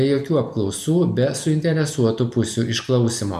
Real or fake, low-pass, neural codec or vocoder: real; 14.4 kHz; none